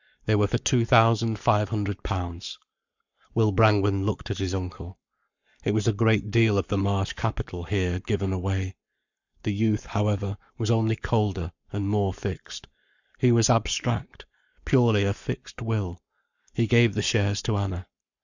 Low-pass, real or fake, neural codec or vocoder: 7.2 kHz; fake; codec, 44.1 kHz, 7.8 kbps, Pupu-Codec